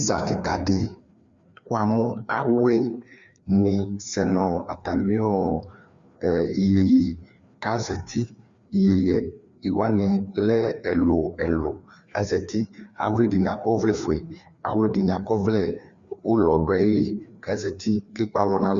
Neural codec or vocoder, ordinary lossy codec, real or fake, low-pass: codec, 16 kHz, 2 kbps, FreqCodec, larger model; Opus, 64 kbps; fake; 7.2 kHz